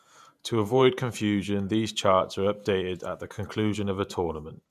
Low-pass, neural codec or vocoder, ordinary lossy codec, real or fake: 14.4 kHz; vocoder, 48 kHz, 128 mel bands, Vocos; none; fake